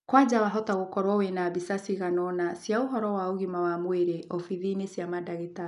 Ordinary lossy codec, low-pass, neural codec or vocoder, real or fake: none; 10.8 kHz; none; real